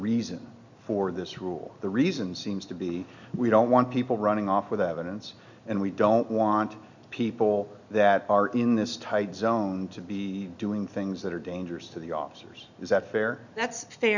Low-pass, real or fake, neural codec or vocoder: 7.2 kHz; real; none